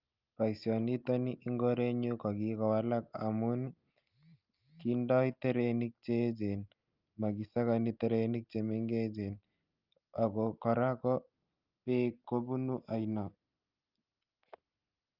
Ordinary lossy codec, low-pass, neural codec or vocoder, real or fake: Opus, 24 kbps; 5.4 kHz; none; real